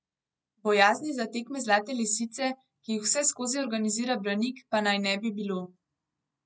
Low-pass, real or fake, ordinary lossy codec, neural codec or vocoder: none; real; none; none